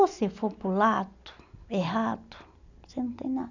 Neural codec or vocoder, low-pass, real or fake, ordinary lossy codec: none; 7.2 kHz; real; none